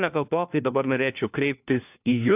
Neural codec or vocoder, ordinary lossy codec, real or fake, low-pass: codec, 16 kHz, 1 kbps, FunCodec, trained on LibriTTS, 50 frames a second; AAC, 32 kbps; fake; 3.6 kHz